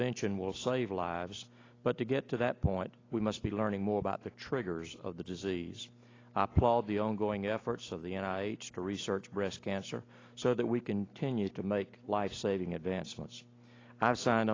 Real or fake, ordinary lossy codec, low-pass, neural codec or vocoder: real; AAC, 32 kbps; 7.2 kHz; none